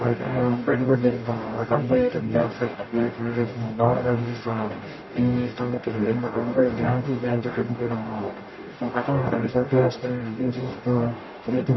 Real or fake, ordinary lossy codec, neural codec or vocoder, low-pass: fake; MP3, 24 kbps; codec, 44.1 kHz, 0.9 kbps, DAC; 7.2 kHz